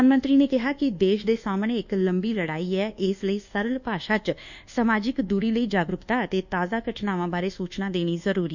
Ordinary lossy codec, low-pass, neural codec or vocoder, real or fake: none; 7.2 kHz; codec, 24 kHz, 1.2 kbps, DualCodec; fake